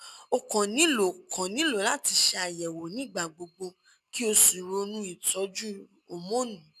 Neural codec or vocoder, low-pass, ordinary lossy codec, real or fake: none; 14.4 kHz; none; real